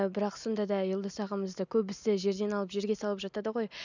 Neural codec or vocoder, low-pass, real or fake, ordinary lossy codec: none; 7.2 kHz; real; none